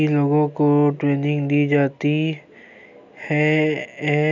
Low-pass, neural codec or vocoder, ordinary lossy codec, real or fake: 7.2 kHz; none; none; real